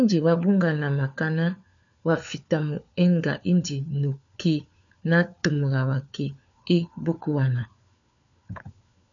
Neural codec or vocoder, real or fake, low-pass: codec, 16 kHz, 4 kbps, FunCodec, trained on LibriTTS, 50 frames a second; fake; 7.2 kHz